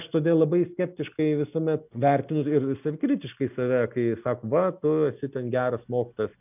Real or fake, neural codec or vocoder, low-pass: real; none; 3.6 kHz